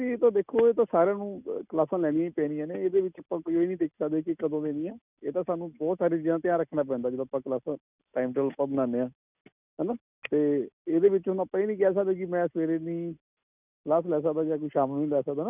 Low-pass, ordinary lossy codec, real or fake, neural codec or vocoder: 3.6 kHz; none; real; none